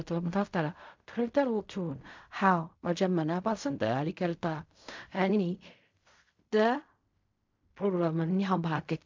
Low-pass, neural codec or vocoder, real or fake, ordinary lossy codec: 7.2 kHz; codec, 16 kHz in and 24 kHz out, 0.4 kbps, LongCat-Audio-Codec, fine tuned four codebook decoder; fake; MP3, 64 kbps